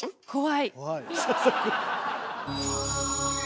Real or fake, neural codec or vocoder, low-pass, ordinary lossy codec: real; none; none; none